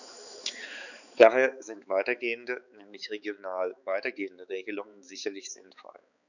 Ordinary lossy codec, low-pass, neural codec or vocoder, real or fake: none; 7.2 kHz; codec, 16 kHz, 4 kbps, X-Codec, HuBERT features, trained on balanced general audio; fake